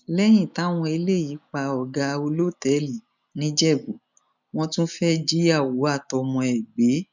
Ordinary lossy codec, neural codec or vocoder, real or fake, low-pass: none; none; real; 7.2 kHz